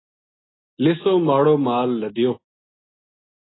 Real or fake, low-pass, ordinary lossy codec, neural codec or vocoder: real; 7.2 kHz; AAC, 16 kbps; none